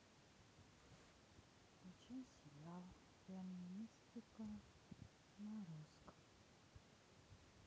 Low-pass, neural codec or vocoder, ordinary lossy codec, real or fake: none; none; none; real